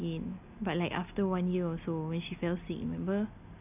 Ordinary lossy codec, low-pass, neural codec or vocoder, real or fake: none; 3.6 kHz; none; real